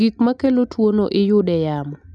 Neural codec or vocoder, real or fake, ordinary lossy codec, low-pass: none; real; none; none